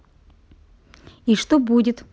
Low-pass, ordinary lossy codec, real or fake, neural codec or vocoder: none; none; real; none